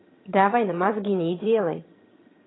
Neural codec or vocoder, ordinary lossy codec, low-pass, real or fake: vocoder, 22.05 kHz, 80 mel bands, HiFi-GAN; AAC, 16 kbps; 7.2 kHz; fake